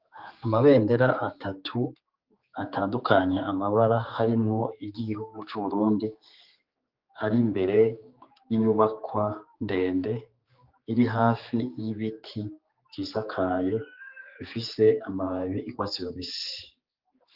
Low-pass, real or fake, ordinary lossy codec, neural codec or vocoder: 5.4 kHz; fake; Opus, 32 kbps; codec, 16 kHz, 4 kbps, X-Codec, HuBERT features, trained on general audio